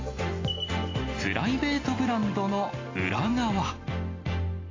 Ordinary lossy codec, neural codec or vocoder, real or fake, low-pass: AAC, 32 kbps; none; real; 7.2 kHz